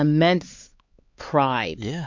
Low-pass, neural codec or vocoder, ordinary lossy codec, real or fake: 7.2 kHz; codec, 16 kHz, 4 kbps, X-Codec, HuBERT features, trained on LibriSpeech; MP3, 48 kbps; fake